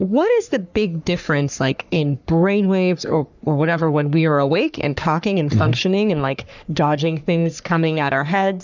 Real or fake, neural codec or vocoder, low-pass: fake; codec, 44.1 kHz, 3.4 kbps, Pupu-Codec; 7.2 kHz